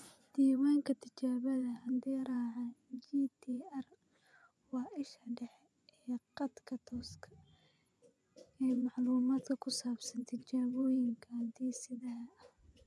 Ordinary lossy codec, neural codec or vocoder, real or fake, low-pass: none; vocoder, 24 kHz, 100 mel bands, Vocos; fake; none